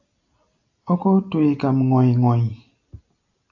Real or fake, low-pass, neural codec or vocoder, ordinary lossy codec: real; 7.2 kHz; none; AAC, 48 kbps